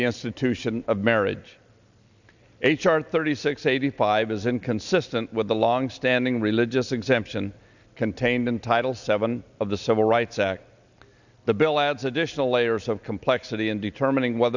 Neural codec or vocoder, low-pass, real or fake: none; 7.2 kHz; real